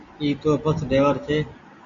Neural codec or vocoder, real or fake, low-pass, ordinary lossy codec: none; real; 7.2 kHz; Opus, 64 kbps